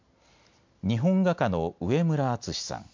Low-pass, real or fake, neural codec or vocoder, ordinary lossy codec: 7.2 kHz; real; none; MP3, 64 kbps